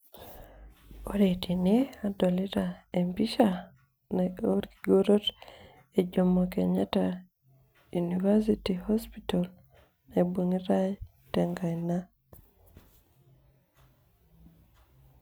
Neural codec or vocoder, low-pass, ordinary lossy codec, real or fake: none; none; none; real